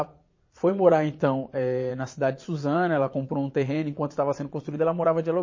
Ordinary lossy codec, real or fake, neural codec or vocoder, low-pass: MP3, 32 kbps; fake; vocoder, 22.05 kHz, 80 mel bands, Vocos; 7.2 kHz